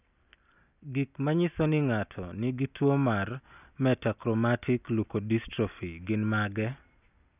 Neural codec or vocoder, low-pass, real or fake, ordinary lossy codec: none; 3.6 kHz; real; none